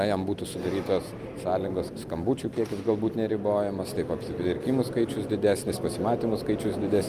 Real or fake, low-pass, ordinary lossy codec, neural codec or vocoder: real; 14.4 kHz; Opus, 32 kbps; none